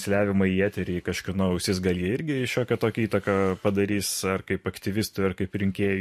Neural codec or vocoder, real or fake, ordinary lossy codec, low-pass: vocoder, 44.1 kHz, 128 mel bands every 512 samples, BigVGAN v2; fake; MP3, 64 kbps; 14.4 kHz